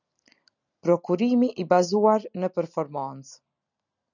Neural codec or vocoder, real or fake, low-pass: none; real; 7.2 kHz